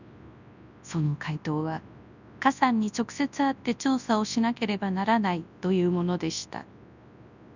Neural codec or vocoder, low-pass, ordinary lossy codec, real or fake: codec, 24 kHz, 0.9 kbps, WavTokenizer, large speech release; 7.2 kHz; none; fake